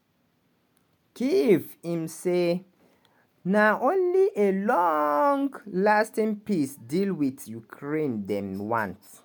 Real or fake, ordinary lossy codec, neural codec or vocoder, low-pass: real; MP3, 96 kbps; none; 19.8 kHz